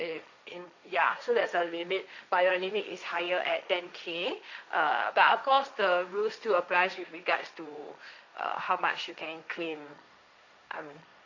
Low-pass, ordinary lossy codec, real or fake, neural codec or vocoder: 7.2 kHz; none; fake; codec, 16 kHz, 1.1 kbps, Voila-Tokenizer